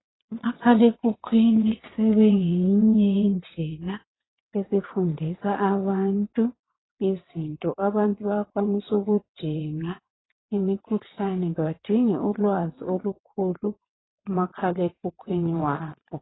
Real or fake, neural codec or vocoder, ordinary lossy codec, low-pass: fake; vocoder, 22.05 kHz, 80 mel bands, WaveNeXt; AAC, 16 kbps; 7.2 kHz